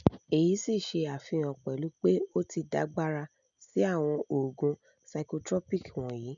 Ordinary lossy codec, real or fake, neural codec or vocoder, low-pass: none; real; none; 7.2 kHz